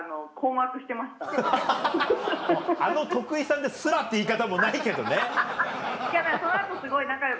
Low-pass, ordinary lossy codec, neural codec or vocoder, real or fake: none; none; none; real